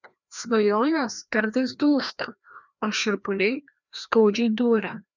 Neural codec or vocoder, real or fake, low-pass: codec, 16 kHz, 1 kbps, FreqCodec, larger model; fake; 7.2 kHz